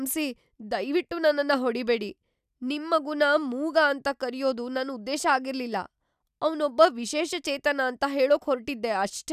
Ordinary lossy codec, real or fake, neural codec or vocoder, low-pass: none; real; none; 14.4 kHz